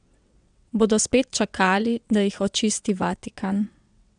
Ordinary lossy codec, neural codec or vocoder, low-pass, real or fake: none; vocoder, 22.05 kHz, 80 mel bands, WaveNeXt; 9.9 kHz; fake